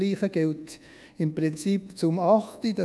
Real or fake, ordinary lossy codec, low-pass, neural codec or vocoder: fake; none; none; codec, 24 kHz, 1.2 kbps, DualCodec